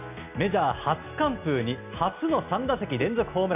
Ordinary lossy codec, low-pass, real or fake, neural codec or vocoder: none; 3.6 kHz; real; none